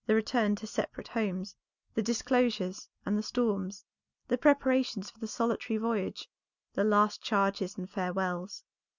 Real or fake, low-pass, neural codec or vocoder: real; 7.2 kHz; none